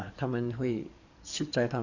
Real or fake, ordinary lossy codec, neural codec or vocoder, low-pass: fake; none; codec, 44.1 kHz, 7.8 kbps, DAC; 7.2 kHz